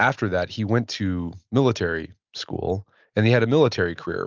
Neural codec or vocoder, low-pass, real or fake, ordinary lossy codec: none; 7.2 kHz; real; Opus, 24 kbps